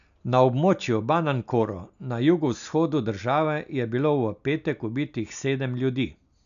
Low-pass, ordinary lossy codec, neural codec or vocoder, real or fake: 7.2 kHz; none; none; real